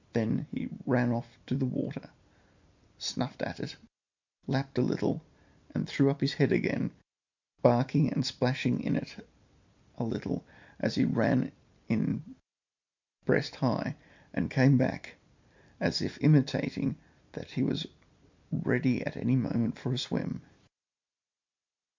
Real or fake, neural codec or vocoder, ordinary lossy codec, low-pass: real; none; MP3, 64 kbps; 7.2 kHz